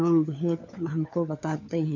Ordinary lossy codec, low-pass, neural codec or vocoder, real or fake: none; 7.2 kHz; codec, 16 kHz, 4 kbps, FunCodec, trained on LibriTTS, 50 frames a second; fake